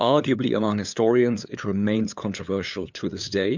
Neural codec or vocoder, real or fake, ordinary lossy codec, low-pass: codec, 16 kHz, 8 kbps, FunCodec, trained on LibriTTS, 25 frames a second; fake; MP3, 64 kbps; 7.2 kHz